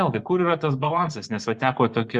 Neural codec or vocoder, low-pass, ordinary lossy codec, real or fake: vocoder, 24 kHz, 100 mel bands, Vocos; 10.8 kHz; Opus, 64 kbps; fake